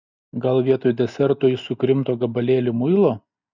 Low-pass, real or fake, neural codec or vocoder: 7.2 kHz; real; none